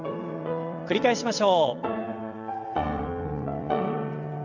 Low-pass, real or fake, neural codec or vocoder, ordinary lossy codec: 7.2 kHz; fake; vocoder, 22.05 kHz, 80 mel bands, WaveNeXt; none